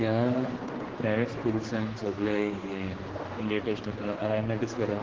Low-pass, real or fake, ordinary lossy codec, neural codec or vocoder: 7.2 kHz; fake; Opus, 16 kbps; codec, 16 kHz, 2 kbps, X-Codec, HuBERT features, trained on general audio